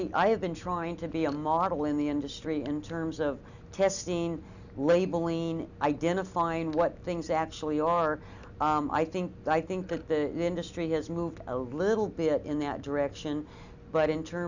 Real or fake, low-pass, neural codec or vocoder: real; 7.2 kHz; none